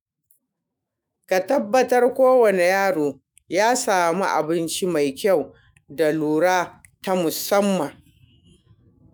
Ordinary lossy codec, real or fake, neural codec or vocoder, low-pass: none; fake; autoencoder, 48 kHz, 128 numbers a frame, DAC-VAE, trained on Japanese speech; none